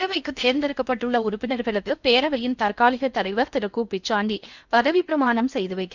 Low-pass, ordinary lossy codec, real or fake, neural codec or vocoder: 7.2 kHz; none; fake; codec, 16 kHz in and 24 kHz out, 0.6 kbps, FocalCodec, streaming, 4096 codes